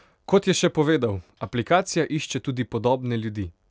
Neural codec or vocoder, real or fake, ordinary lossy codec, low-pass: none; real; none; none